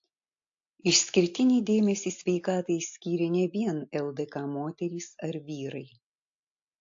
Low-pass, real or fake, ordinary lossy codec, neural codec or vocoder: 7.2 kHz; real; AAC, 48 kbps; none